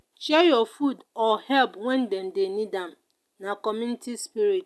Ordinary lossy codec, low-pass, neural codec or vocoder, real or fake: none; none; none; real